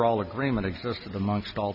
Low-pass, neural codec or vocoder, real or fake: 5.4 kHz; none; real